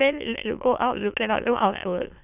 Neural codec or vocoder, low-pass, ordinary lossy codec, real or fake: autoencoder, 22.05 kHz, a latent of 192 numbers a frame, VITS, trained on many speakers; 3.6 kHz; none; fake